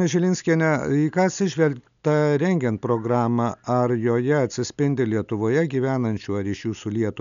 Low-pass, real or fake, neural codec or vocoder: 7.2 kHz; real; none